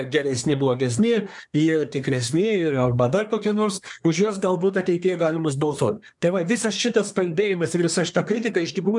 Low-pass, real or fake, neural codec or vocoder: 10.8 kHz; fake; codec, 24 kHz, 1 kbps, SNAC